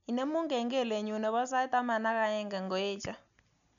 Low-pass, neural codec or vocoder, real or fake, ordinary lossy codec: 7.2 kHz; none; real; none